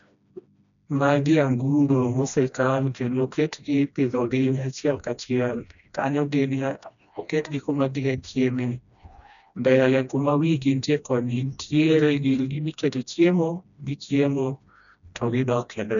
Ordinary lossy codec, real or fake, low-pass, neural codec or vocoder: none; fake; 7.2 kHz; codec, 16 kHz, 1 kbps, FreqCodec, smaller model